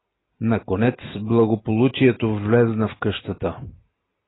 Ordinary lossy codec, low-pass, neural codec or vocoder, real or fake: AAC, 16 kbps; 7.2 kHz; none; real